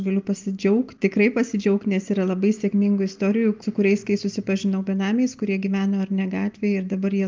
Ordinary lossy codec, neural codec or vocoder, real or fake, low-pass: Opus, 24 kbps; none; real; 7.2 kHz